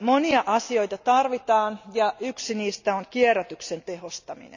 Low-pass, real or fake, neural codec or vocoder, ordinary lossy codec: 7.2 kHz; real; none; none